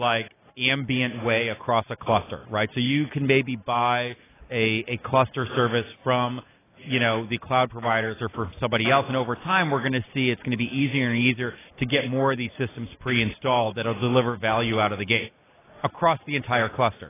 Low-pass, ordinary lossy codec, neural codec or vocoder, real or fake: 3.6 kHz; AAC, 16 kbps; none; real